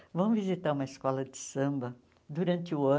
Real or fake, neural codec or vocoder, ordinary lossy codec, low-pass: real; none; none; none